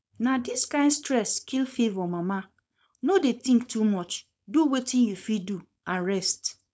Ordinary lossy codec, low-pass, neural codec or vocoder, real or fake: none; none; codec, 16 kHz, 4.8 kbps, FACodec; fake